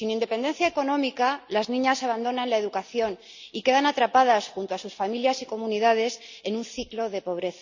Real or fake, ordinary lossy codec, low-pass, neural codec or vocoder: real; Opus, 64 kbps; 7.2 kHz; none